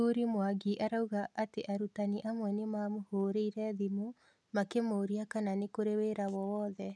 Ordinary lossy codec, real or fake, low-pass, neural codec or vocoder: none; real; none; none